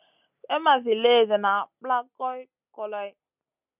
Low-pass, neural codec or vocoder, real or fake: 3.6 kHz; none; real